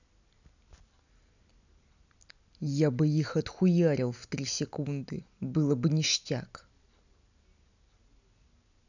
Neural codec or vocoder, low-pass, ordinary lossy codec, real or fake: none; 7.2 kHz; none; real